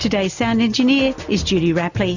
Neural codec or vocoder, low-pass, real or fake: none; 7.2 kHz; real